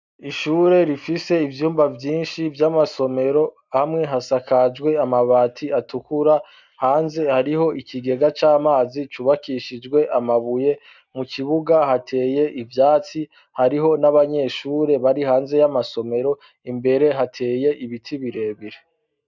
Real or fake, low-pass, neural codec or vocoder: real; 7.2 kHz; none